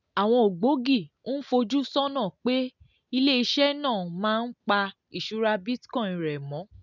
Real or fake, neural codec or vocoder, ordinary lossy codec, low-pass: real; none; none; 7.2 kHz